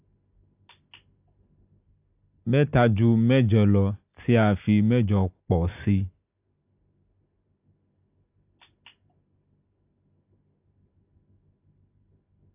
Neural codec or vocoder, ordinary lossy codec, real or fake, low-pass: none; AAC, 32 kbps; real; 3.6 kHz